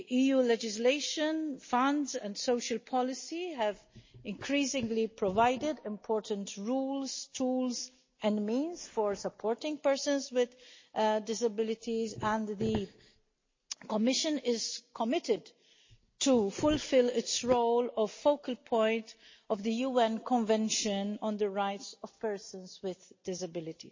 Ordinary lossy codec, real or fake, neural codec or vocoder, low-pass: MP3, 32 kbps; real; none; 7.2 kHz